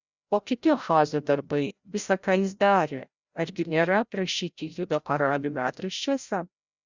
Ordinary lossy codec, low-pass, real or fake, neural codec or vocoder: Opus, 64 kbps; 7.2 kHz; fake; codec, 16 kHz, 0.5 kbps, FreqCodec, larger model